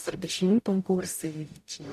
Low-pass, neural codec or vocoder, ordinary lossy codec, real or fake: 14.4 kHz; codec, 44.1 kHz, 0.9 kbps, DAC; AAC, 96 kbps; fake